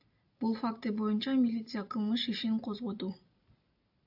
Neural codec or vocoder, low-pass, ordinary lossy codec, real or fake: none; 5.4 kHz; AAC, 48 kbps; real